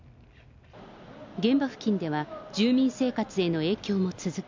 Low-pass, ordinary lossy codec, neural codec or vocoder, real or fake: 7.2 kHz; MP3, 64 kbps; none; real